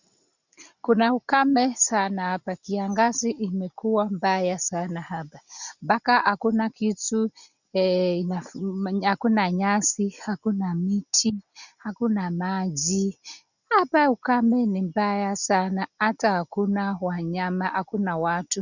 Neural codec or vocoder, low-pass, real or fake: none; 7.2 kHz; real